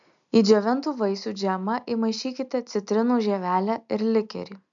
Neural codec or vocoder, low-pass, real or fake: none; 7.2 kHz; real